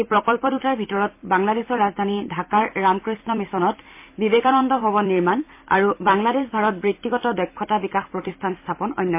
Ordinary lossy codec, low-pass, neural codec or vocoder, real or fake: MP3, 24 kbps; 3.6 kHz; vocoder, 44.1 kHz, 128 mel bands every 256 samples, BigVGAN v2; fake